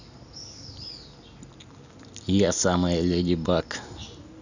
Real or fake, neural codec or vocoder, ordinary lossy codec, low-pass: real; none; none; 7.2 kHz